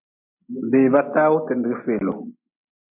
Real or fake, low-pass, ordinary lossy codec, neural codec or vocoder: real; 3.6 kHz; MP3, 32 kbps; none